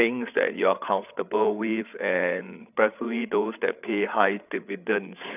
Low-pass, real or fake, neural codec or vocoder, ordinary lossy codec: 3.6 kHz; fake; codec, 16 kHz, 8 kbps, FreqCodec, larger model; none